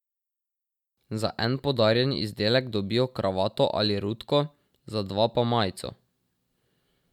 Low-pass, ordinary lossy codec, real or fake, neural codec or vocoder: 19.8 kHz; none; real; none